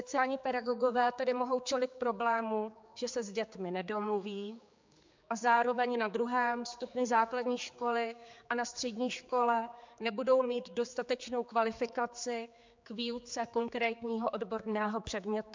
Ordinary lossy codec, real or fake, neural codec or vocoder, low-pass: MP3, 64 kbps; fake; codec, 16 kHz, 4 kbps, X-Codec, HuBERT features, trained on general audio; 7.2 kHz